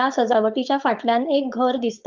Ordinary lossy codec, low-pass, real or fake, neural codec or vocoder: Opus, 24 kbps; 7.2 kHz; fake; codec, 16 kHz, 4.8 kbps, FACodec